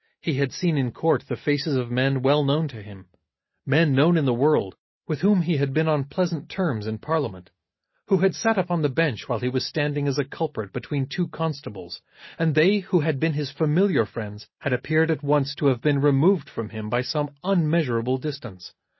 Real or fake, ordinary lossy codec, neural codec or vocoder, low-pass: real; MP3, 24 kbps; none; 7.2 kHz